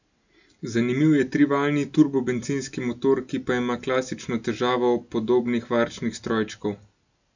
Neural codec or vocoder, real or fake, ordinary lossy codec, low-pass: none; real; none; 7.2 kHz